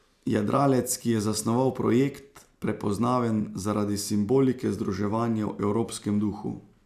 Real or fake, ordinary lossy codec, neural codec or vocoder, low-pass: real; none; none; 14.4 kHz